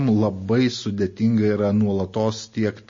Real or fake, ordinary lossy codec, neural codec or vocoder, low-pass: real; MP3, 32 kbps; none; 7.2 kHz